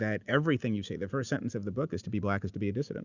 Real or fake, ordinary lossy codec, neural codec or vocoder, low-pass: real; Opus, 64 kbps; none; 7.2 kHz